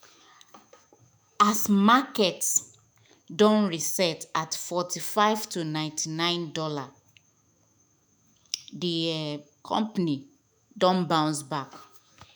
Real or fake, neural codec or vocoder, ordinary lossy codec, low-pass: fake; autoencoder, 48 kHz, 128 numbers a frame, DAC-VAE, trained on Japanese speech; none; none